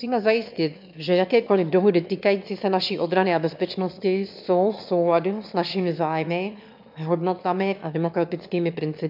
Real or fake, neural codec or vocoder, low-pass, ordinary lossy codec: fake; autoencoder, 22.05 kHz, a latent of 192 numbers a frame, VITS, trained on one speaker; 5.4 kHz; MP3, 48 kbps